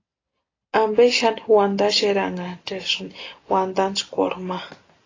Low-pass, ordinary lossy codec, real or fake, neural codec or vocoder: 7.2 kHz; AAC, 32 kbps; real; none